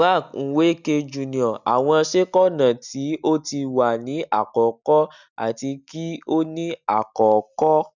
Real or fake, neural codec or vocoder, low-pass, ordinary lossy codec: real; none; 7.2 kHz; none